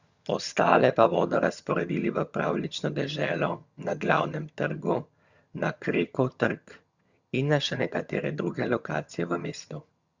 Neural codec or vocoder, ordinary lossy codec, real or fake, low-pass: vocoder, 22.05 kHz, 80 mel bands, HiFi-GAN; Opus, 64 kbps; fake; 7.2 kHz